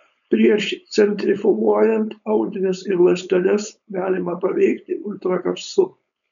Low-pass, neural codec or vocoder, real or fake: 7.2 kHz; codec, 16 kHz, 4.8 kbps, FACodec; fake